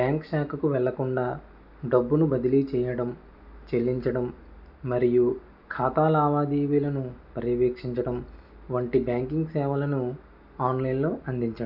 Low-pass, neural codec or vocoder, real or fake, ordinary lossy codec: 5.4 kHz; none; real; none